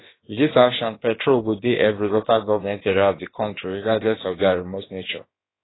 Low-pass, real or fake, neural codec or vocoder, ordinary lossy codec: 7.2 kHz; fake; codec, 16 kHz, about 1 kbps, DyCAST, with the encoder's durations; AAC, 16 kbps